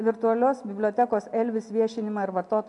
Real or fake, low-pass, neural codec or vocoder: real; 10.8 kHz; none